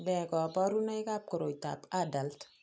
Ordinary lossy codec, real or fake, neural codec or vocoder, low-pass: none; real; none; none